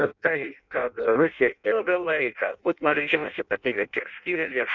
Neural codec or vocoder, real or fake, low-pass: codec, 16 kHz in and 24 kHz out, 0.6 kbps, FireRedTTS-2 codec; fake; 7.2 kHz